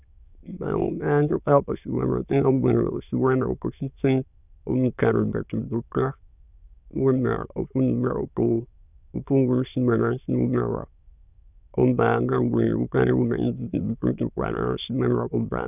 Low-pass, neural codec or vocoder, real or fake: 3.6 kHz; autoencoder, 22.05 kHz, a latent of 192 numbers a frame, VITS, trained on many speakers; fake